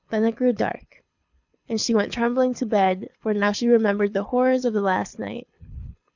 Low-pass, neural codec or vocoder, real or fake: 7.2 kHz; codec, 24 kHz, 6 kbps, HILCodec; fake